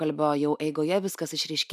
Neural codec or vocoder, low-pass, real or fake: none; 14.4 kHz; real